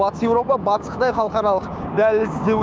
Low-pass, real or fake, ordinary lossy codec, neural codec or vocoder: none; fake; none; codec, 16 kHz, 6 kbps, DAC